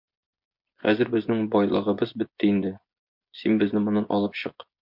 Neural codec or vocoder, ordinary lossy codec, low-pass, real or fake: vocoder, 24 kHz, 100 mel bands, Vocos; MP3, 48 kbps; 5.4 kHz; fake